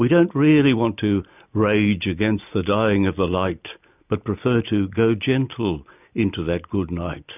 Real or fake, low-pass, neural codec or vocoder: real; 3.6 kHz; none